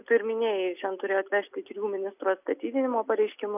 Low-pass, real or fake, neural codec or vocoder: 3.6 kHz; real; none